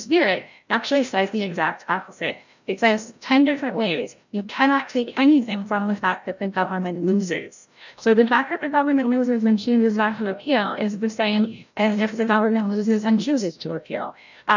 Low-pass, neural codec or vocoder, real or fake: 7.2 kHz; codec, 16 kHz, 0.5 kbps, FreqCodec, larger model; fake